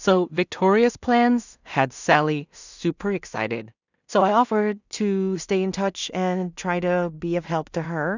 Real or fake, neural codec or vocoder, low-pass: fake; codec, 16 kHz in and 24 kHz out, 0.4 kbps, LongCat-Audio-Codec, two codebook decoder; 7.2 kHz